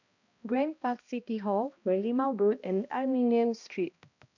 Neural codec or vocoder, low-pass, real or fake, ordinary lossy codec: codec, 16 kHz, 1 kbps, X-Codec, HuBERT features, trained on balanced general audio; 7.2 kHz; fake; none